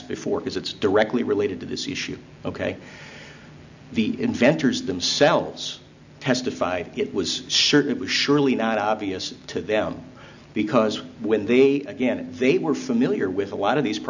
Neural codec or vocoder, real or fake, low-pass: none; real; 7.2 kHz